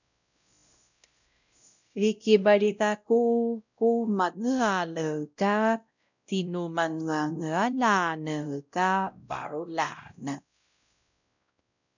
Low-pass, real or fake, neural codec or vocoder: 7.2 kHz; fake; codec, 16 kHz, 0.5 kbps, X-Codec, WavLM features, trained on Multilingual LibriSpeech